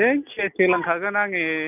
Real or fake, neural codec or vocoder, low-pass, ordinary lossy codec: real; none; 3.6 kHz; none